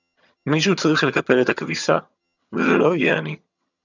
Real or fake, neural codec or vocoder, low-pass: fake; vocoder, 22.05 kHz, 80 mel bands, HiFi-GAN; 7.2 kHz